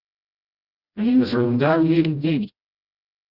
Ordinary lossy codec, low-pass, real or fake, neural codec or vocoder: Opus, 64 kbps; 5.4 kHz; fake; codec, 16 kHz, 0.5 kbps, FreqCodec, smaller model